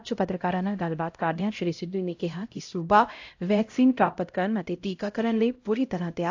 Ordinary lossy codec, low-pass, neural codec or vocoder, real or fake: AAC, 48 kbps; 7.2 kHz; codec, 16 kHz, 0.5 kbps, X-Codec, HuBERT features, trained on LibriSpeech; fake